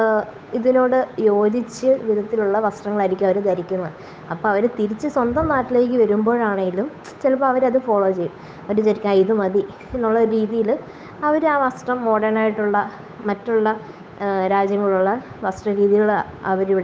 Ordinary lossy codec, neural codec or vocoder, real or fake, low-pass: none; codec, 16 kHz, 8 kbps, FunCodec, trained on Chinese and English, 25 frames a second; fake; none